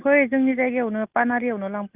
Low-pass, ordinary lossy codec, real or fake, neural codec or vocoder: 3.6 kHz; Opus, 24 kbps; real; none